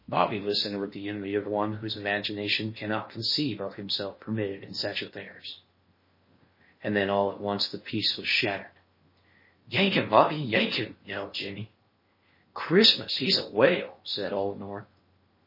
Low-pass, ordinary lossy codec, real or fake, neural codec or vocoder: 5.4 kHz; MP3, 24 kbps; fake; codec, 16 kHz in and 24 kHz out, 0.8 kbps, FocalCodec, streaming, 65536 codes